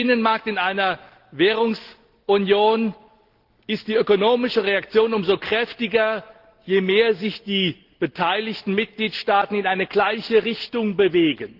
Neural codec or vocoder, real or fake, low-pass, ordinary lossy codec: none; real; 5.4 kHz; Opus, 16 kbps